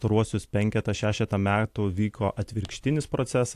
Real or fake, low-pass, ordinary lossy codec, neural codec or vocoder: real; 14.4 kHz; AAC, 64 kbps; none